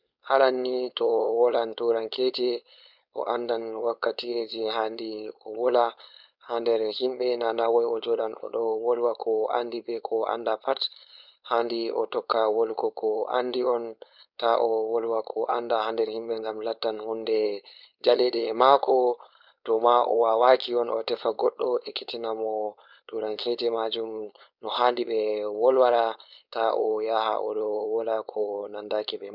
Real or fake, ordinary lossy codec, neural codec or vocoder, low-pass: fake; none; codec, 16 kHz, 4.8 kbps, FACodec; 5.4 kHz